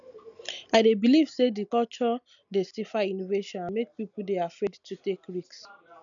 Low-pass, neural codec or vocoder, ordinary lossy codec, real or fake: 7.2 kHz; none; none; real